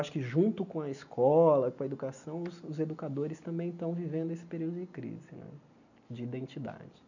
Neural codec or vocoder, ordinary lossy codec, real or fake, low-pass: none; none; real; 7.2 kHz